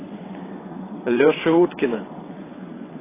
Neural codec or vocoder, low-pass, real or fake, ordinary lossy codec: vocoder, 22.05 kHz, 80 mel bands, WaveNeXt; 3.6 kHz; fake; AAC, 16 kbps